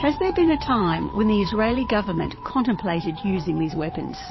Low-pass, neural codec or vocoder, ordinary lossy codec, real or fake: 7.2 kHz; vocoder, 22.05 kHz, 80 mel bands, WaveNeXt; MP3, 24 kbps; fake